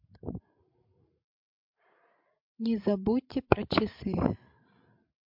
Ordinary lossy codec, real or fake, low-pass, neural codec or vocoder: MP3, 48 kbps; fake; 5.4 kHz; codec, 16 kHz, 16 kbps, FreqCodec, larger model